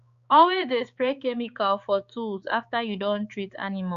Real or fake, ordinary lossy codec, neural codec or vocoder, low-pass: fake; Opus, 64 kbps; codec, 16 kHz, 4 kbps, X-Codec, HuBERT features, trained on balanced general audio; 7.2 kHz